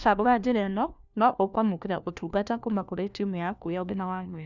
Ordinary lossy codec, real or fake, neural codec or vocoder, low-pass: none; fake; codec, 16 kHz, 1 kbps, FunCodec, trained on LibriTTS, 50 frames a second; 7.2 kHz